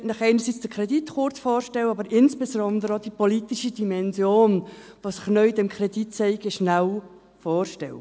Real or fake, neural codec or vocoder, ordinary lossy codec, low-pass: real; none; none; none